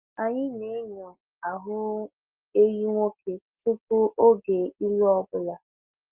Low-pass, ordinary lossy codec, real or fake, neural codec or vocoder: 3.6 kHz; Opus, 32 kbps; real; none